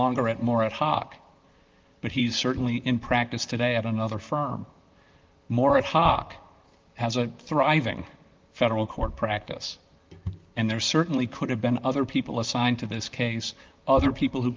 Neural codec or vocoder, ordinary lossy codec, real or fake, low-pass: vocoder, 44.1 kHz, 128 mel bands, Pupu-Vocoder; Opus, 32 kbps; fake; 7.2 kHz